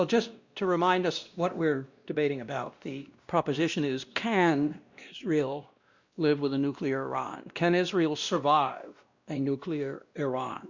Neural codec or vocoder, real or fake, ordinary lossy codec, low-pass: codec, 16 kHz, 1 kbps, X-Codec, WavLM features, trained on Multilingual LibriSpeech; fake; Opus, 64 kbps; 7.2 kHz